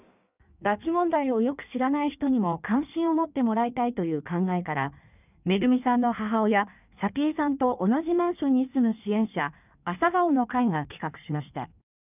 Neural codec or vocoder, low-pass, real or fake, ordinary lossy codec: codec, 16 kHz in and 24 kHz out, 1.1 kbps, FireRedTTS-2 codec; 3.6 kHz; fake; none